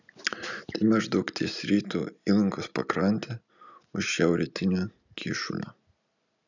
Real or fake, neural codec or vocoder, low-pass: real; none; 7.2 kHz